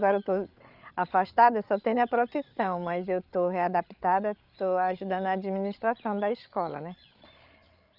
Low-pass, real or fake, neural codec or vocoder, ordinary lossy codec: 5.4 kHz; fake; codec, 16 kHz, 8 kbps, FreqCodec, larger model; none